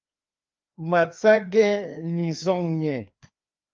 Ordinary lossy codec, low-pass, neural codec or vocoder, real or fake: Opus, 24 kbps; 7.2 kHz; codec, 16 kHz, 2 kbps, FreqCodec, larger model; fake